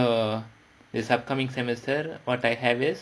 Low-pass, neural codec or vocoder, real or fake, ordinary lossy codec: none; none; real; none